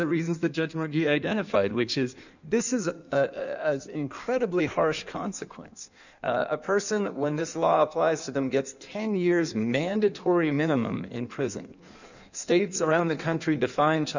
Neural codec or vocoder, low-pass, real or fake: codec, 16 kHz in and 24 kHz out, 1.1 kbps, FireRedTTS-2 codec; 7.2 kHz; fake